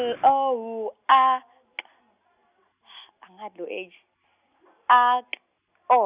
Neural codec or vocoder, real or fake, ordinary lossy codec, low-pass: none; real; Opus, 64 kbps; 3.6 kHz